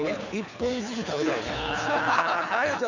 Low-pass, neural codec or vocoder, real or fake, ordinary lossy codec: 7.2 kHz; codec, 24 kHz, 6 kbps, HILCodec; fake; none